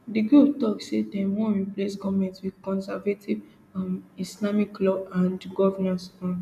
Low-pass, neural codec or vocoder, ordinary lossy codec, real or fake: 14.4 kHz; none; none; real